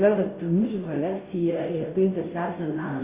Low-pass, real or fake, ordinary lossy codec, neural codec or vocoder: 3.6 kHz; fake; Opus, 64 kbps; codec, 16 kHz, 0.5 kbps, FunCodec, trained on Chinese and English, 25 frames a second